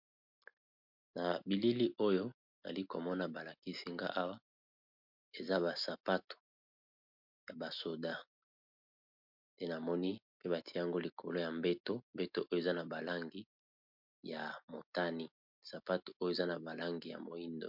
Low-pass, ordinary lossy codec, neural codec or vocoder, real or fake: 5.4 kHz; MP3, 48 kbps; none; real